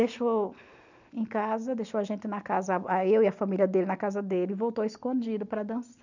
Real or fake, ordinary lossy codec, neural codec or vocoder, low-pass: real; none; none; 7.2 kHz